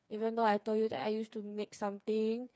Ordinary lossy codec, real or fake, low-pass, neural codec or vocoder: none; fake; none; codec, 16 kHz, 4 kbps, FreqCodec, smaller model